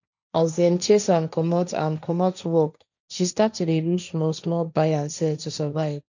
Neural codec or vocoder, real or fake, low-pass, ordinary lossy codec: codec, 16 kHz, 1.1 kbps, Voila-Tokenizer; fake; 7.2 kHz; none